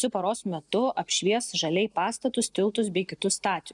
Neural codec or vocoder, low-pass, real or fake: none; 10.8 kHz; real